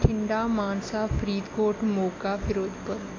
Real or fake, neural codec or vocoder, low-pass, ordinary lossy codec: real; none; 7.2 kHz; AAC, 32 kbps